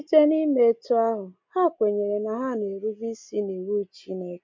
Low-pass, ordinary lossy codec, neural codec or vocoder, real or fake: 7.2 kHz; MP3, 48 kbps; none; real